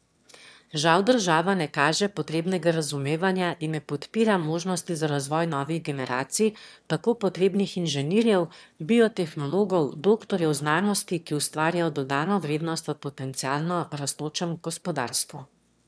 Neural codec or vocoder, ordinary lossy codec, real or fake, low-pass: autoencoder, 22.05 kHz, a latent of 192 numbers a frame, VITS, trained on one speaker; none; fake; none